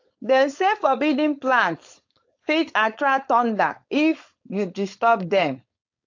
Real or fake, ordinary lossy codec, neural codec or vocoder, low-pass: fake; AAC, 48 kbps; codec, 16 kHz, 4.8 kbps, FACodec; 7.2 kHz